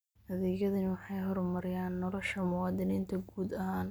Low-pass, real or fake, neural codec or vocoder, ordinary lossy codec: none; real; none; none